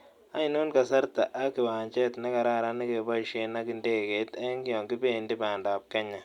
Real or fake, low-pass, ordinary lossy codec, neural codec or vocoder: real; 19.8 kHz; Opus, 64 kbps; none